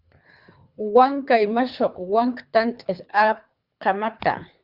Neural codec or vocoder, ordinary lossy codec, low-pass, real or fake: codec, 24 kHz, 3 kbps, HILCodec; Opus, 64 kbps; 5.4 kHz; fake